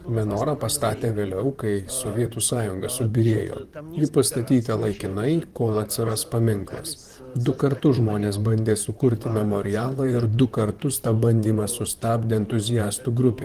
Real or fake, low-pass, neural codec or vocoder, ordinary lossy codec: fake; 14.4 kHz; vocoder, 44.1 kHz, 128 mel bands, Pupu-Vocoder; Opus, 24 kbps